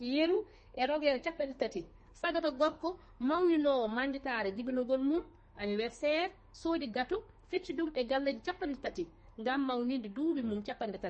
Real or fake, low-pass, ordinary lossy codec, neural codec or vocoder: fake; 10.8 kHz; MP3, 32 kbps; codec, 32 kHz, 1.9 kbps, SNAC